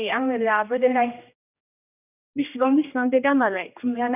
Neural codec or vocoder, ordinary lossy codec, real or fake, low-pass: codec, 16 kHz, 1 kbps, X-Codec, HuBERT features, trained on general audio; none; fake; 3.6 kHz